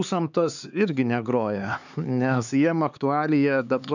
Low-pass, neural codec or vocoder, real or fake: 7.2 kHz; codec, 16 kHz, 4 kbps, X-Codec, HuBERT features, trained on LibriSpeech; fake